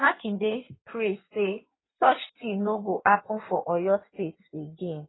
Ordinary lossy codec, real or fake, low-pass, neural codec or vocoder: AAC, 16 kbps; fake; 7.2 kHz; codec, 16 kHz in and 24 kHz out, 1.1 kbps, FireRedTTS-2 codec